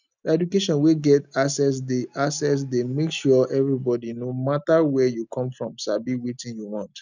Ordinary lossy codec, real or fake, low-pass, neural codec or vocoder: none; real; 7.2 kHz; none